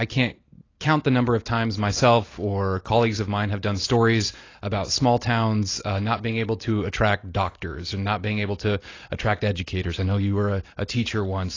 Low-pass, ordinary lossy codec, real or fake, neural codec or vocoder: 7.2 kHz; AAC, 32 kbps; real; none